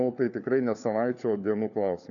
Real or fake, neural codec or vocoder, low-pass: fake; codec, 16 kHz, 4 kbps, FunCodec, trained on LibriTTS, 50 frames a second; 7.2 kHz